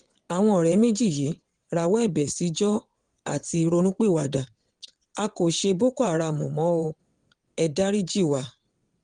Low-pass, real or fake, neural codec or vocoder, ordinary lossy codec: 9.9 kHz; fake; vocoder, 22.05 kHz, 80 mel bands, WaveNeXt; Opus, 24 kbps